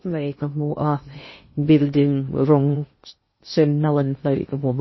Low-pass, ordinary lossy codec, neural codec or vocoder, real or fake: 7.2 kHz; MP3, 24 kbps; codec, 16 kHz in and 24 kHz out, 0.6 kbps, FocalCodec, streaming, 2048 codes; fake